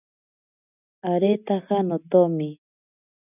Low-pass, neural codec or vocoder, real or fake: 3.6 kHz; none; real